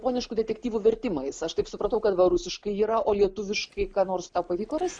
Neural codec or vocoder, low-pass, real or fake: none; 9.9 kHz; real